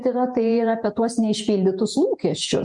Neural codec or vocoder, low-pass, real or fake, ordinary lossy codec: vocoder, 48 kHz, 128 mel bands, Vocos; 10.8 kHz; fake; MP3, 96 kbps